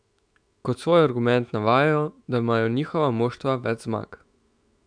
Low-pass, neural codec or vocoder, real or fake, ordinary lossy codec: 9.9 kHz; autoencoder, 48 kHz, 128 numbers a frame, DAC-VAE, trained on Japanese speech; fake; none